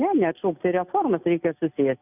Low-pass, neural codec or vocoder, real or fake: 3.6 kHz; none; real